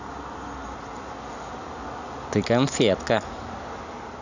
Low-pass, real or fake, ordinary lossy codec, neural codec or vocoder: 7.2 kHz; real; none; none